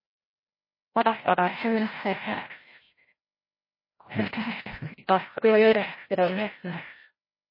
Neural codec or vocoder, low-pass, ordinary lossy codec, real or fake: codec, 16 kHz, 0.5 kbps, FreqCodec, larger model; 5.4 kHz; MP3, 24 kbps; fake